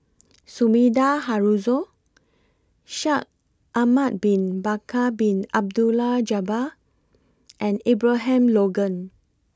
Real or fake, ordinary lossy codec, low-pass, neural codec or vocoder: real; none; none; none